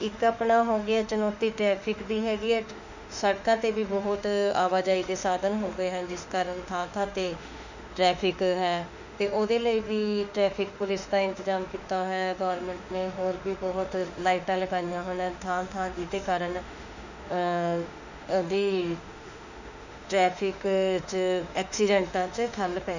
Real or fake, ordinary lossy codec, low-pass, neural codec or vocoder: fake; none; 7.2 kHz; autoencoder, 48 kHz, 32 numbers a frame, DAC-VAE, trained on Japanese speech